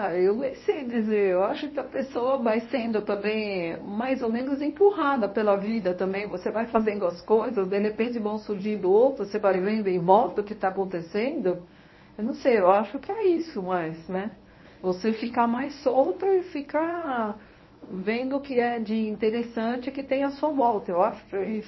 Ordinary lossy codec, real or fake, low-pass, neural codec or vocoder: MP3, 24 kbps; fake; 7.2 kHz; codec, 24 kHz, 0.9 kbps, WavTokenizer, medium speech release version 1